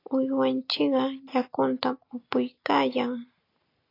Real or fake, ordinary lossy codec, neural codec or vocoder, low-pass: real; AAC, 32 kbps; none; 5.4 kHz